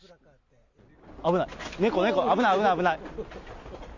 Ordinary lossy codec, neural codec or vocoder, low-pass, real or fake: Opus, 64 kbps; none; 7.2 kHz; real